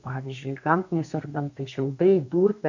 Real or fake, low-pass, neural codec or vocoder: fake; 7.2 kHz; codec, 32 kHz, 1.9 kbps, SNAC